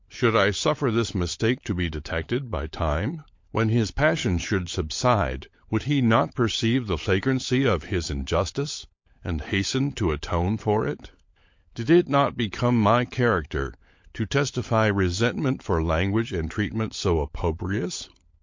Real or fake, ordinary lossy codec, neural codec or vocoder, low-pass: fake; MP3, 48 kbps; codec, 16 kHz, 16 kbps, FunCodec, trained on LibriTTS, 50 frames a second; 7.2 kHz